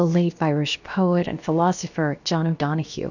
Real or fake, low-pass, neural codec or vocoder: fake; 7.2 kHz; codec, 16 kHz, about 1 kbps, DyCAST, with the encoder's durations